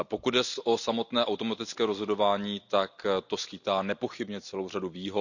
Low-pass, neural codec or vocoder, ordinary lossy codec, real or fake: 7.2 kHz; none; none; real